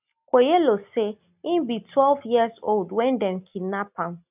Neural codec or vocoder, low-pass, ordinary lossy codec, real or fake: none; 3.6 kHz; none; real